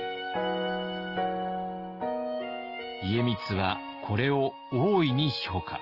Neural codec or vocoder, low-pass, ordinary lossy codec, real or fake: none; 5.4 kHz; Opus, 24 kbps; real